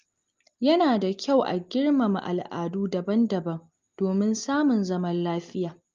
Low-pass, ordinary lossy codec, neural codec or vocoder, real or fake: 7.2 kHz; Opus, 24 kbps; none; real